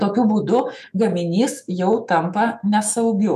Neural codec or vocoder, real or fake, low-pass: autoencoder, 48 kHz, 128 numbers a frame, DAC-VAE, trained on Japanese speech; fake; 14.4 kHz